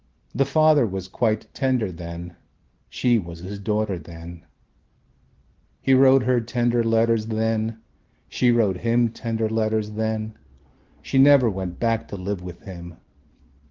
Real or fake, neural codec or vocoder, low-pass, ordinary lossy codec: real; none; 7.2 kHz; Opus, 16 kbps